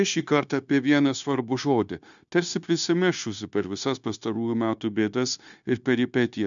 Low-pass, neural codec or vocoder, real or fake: 7.2 kHz; codec, 16 kHz, 0.9 kbps, LongCat-Audio-Codec; fake